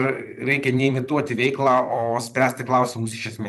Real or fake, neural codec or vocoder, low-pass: fake; vocoder, 44.1 kHz, 128 mel bands, Pupu-Vocoder; 14.4 kHz